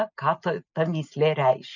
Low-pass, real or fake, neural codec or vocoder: 7.2 kHz; real; none